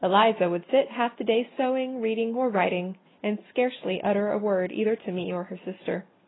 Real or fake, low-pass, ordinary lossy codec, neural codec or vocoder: real; 7.2 kHz; AAC, 16 kbps; none